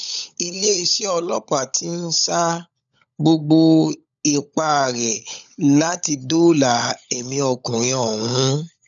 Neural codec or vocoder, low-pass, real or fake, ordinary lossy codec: codec, 16 kHz, 16 kbps, FunCodec, trained on LibriTTS, 50 frames a second; 7.2 kHz; fake; none